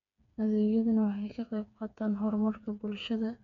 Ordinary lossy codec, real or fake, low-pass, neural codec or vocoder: none; fake; 7.2 kHz; codec, 16 kHz, 4 kbps, FreqCodec, smaller model